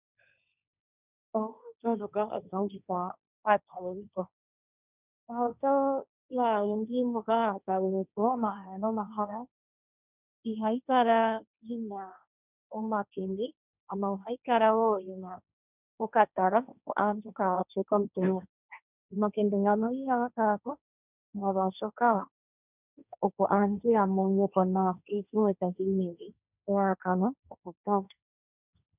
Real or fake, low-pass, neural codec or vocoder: fake; 3.6 kHz; codec, 16 kHz, 1.1 kbps, Voila-Tokenizer